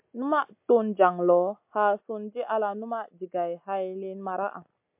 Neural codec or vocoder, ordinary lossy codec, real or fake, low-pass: none; MP3, 32 kbps; real; 3.6 kHz